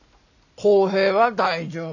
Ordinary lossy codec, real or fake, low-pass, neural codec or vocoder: none; real; 7.2 kHz; none